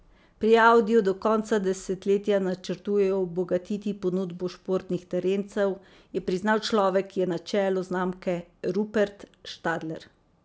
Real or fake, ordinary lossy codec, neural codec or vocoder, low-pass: real; none; none; none